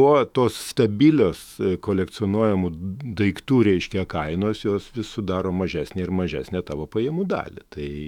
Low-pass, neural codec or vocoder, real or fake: 19.8 kHz; autoencoder, 48 kHz, 128 numbers a frame, DAC-VAE, trained on Japanese speech; fake